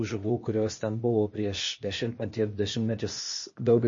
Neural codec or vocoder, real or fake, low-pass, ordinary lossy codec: codec, 16 kHz, 0.8 kbps, ZipCodec; fake; 7.2 kHz; MP3, 32 kbps